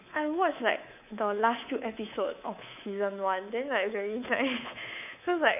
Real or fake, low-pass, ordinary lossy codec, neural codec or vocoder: fake; 3.6 kHz; none; codec, 24 kHz, 3.1 kbps, DualCodec